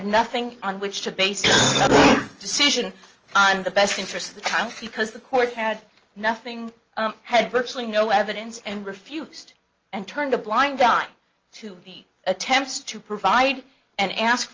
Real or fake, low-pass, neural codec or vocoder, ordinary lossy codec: real; 7.2 kHz; none; Opus, 24 kbps